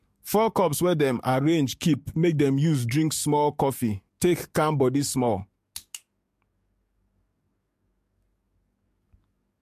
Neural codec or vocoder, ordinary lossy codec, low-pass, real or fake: codec, 44.1 kHz, 7.8 kbps, DAC; MP3, 64 kbps; 14.4 kHz; fake